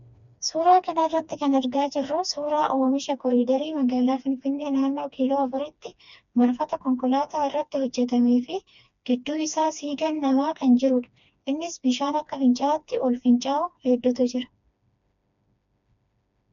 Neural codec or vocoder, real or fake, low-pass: codec, 16 kHz, 2 kbps, FreqCodec, smaller model; fake; 7.2 kHz